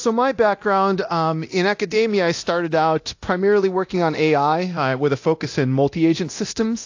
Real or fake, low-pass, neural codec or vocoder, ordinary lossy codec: fake; 7.2 kHz; codec, 24 kHz, 0.9 kbps, DualCodec; AAC, 48 kbps